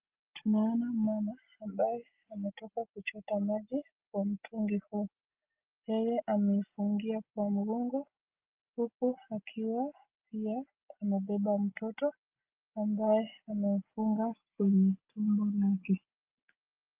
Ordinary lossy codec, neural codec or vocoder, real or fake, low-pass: Opus, 24 kbps; none; real; 3.6 kHz